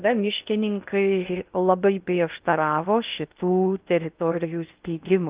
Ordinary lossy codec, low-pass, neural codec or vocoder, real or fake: Opus, 24 kbps; 3.6 kHz; codec, 16 kHz in and 24 kHz out, 0.6 kbps, FocalCodec, streaming, 2048 codes; fake